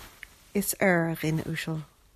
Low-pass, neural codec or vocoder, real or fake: 14.4 kHz; none; real